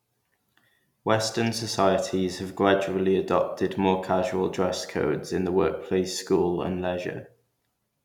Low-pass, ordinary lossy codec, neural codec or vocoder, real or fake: 19.8 kHz; none; none; real